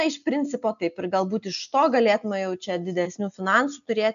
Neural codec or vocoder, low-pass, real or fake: none; 7.2 kHz; real